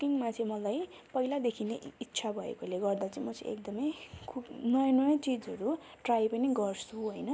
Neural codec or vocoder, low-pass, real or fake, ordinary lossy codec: none; none; real; none